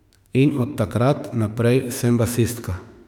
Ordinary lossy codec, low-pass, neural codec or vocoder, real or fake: none; 19.8 kHz; autoencoder, 48 kHz, 32 numbers a frame, DAC-VAE, trained on Japanese speech; fake